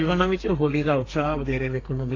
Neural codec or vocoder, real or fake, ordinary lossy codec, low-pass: codec, 32 kHz, 1.9 kbps, SNAC; fake; AAC, 32 kbps; 7.2 kHz